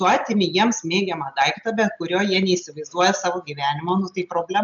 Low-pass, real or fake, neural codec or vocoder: 7.2 kHz; real; none